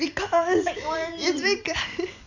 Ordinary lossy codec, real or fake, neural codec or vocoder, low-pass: none; fake; vocoder, 44.1 kHz, 128 mel bands every 256 samples, BigVGAN v2; 7.2 kHz